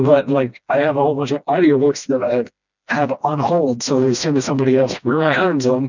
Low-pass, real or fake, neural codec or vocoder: 7.2 kHz; fake; codec, 16 kHz, 1 kbps, FreqCodec, smaller model